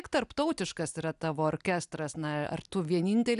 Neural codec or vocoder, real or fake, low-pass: none; real; 10.8 kHz